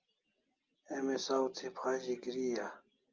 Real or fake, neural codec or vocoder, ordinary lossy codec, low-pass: real; none; Opus, 24 kbps; 7.2 kHz